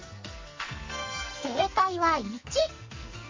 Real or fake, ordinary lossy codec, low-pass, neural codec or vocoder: fake; MP3, 32 kbps; 7.2 kHz; codec, 44.1 kHz, 2.6 kbps, SNAC